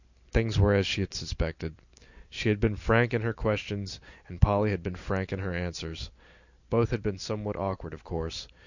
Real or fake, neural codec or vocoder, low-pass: real; none; 7.2 kHz